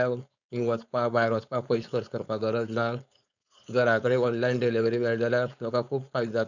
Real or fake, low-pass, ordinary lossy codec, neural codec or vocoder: fake; 7.2 kHz; none; codec, 16 kHz, 4.8 kbps, FACodec